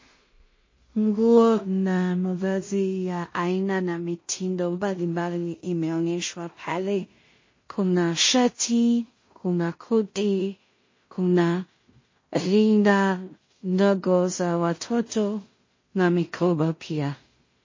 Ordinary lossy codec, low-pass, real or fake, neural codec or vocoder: MP3, 32 kbps; 7.2 kHz; fake; codec, 16 kHz in and 24 kHz out, 0.4 kbps, LongCat-Audio-Codec, two codebook decoder